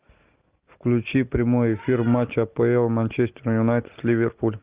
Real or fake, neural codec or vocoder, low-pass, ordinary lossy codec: real; none; 3.6 kHz; Opus, 32 kbps